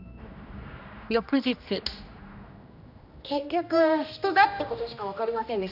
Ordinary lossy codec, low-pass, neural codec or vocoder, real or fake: none; 5.4 kHz; codec, 16 kHz, 1 kbps, X-Codec, HuBERT features, trained on general audio; fake